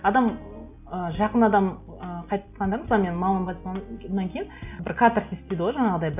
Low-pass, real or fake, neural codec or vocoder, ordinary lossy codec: 3.6 kHz; real; none; none